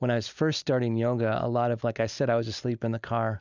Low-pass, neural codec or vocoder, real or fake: 7.2 kHz; none; real